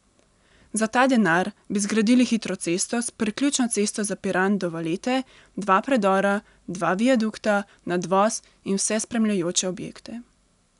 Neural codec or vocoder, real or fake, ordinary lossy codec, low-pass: none; real; none; 10.8 kHz